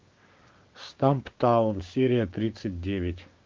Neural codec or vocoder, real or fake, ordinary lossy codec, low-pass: codec, 16 kHz, 6 kbps, DAC; fake; Opus, 24 kbps; 7.2 kHz